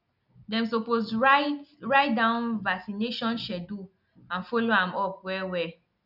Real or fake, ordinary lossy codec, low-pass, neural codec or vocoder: real; none; 5.4 kHz; none